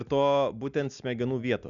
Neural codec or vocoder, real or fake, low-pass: none; real; 7.2 kHz